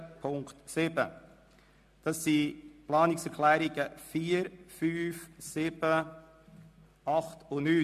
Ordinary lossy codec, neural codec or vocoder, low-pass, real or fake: MP3, 64 kbps; none; 14.4 kHz; real